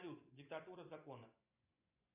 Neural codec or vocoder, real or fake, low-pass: vocoder, 24 kHz, 100 mel bands, Vocos; fake; 3.6 kHz